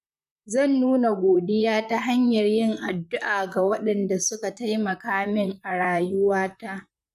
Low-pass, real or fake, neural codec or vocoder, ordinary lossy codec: 14.4 kHz; fake; vocoder, 44.1 kHz, 128 mel bands, Pupu-Vocoder; none